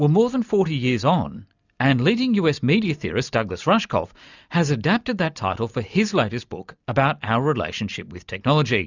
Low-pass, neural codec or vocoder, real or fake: 7.2 kHz; none; real